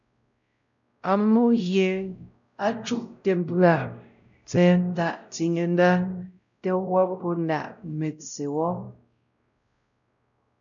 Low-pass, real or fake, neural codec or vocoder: 7.2 kHz; fake; codec, 16 kHz, 0.5 kbps, X-Codec, WavLM features, trained on Multilingual LibriSpeech